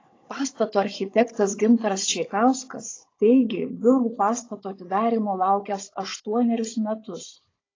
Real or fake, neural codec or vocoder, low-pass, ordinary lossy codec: fake; codec, 16 kHz, 16 kbps, FunCodec, trained on Chinese and English, 50 frames a second; 7.2 kHz; AAC, 32 kbps